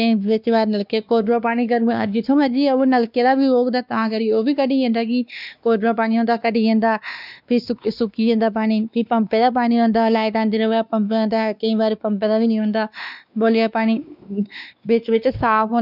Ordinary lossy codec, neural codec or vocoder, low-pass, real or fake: none; codec, 16 kHz, 2 kbps, X-Codec, WavLM features, trained on Multilingual LibriSpeech; 5.4 kHz; fake